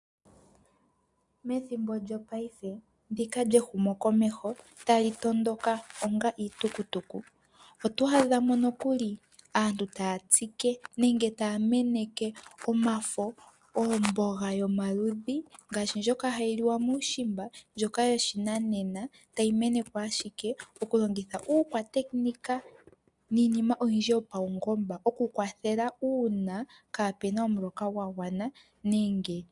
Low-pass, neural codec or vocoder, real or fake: 10.8 kHz; none; real